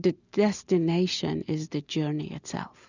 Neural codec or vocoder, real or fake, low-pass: none; real; 7.2 kHz